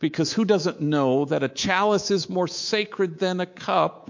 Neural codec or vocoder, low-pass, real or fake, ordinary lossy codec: codec, 24 kHz, 3.1 kbps, DualCodec; 7.2 kHz; fake; MP3, 48 kbps